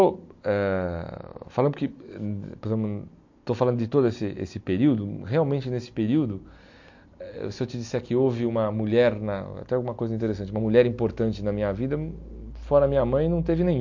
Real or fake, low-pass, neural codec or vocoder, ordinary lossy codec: real; 7.2 kHz; none; MP3, 48 kbps